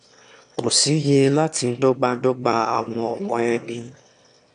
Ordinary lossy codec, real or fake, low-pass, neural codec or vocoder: none; fake; 9.9 kHz; autoencoder, 22.05 kHz, a latent of 192 numbers a frame, VITS, trained on one speaker